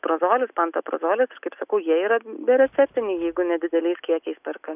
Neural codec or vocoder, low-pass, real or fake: none; 3.6 kHz; real